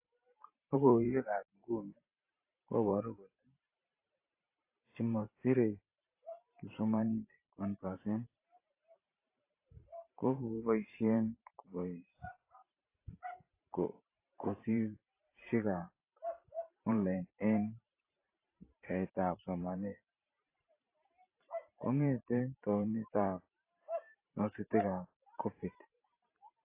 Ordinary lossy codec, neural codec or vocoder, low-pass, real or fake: AAC, 24 kbps; vocoder, 44.1 kHz, 128 mel bands every 512 samples, BigVGAN v2; 3.6 kHz; fake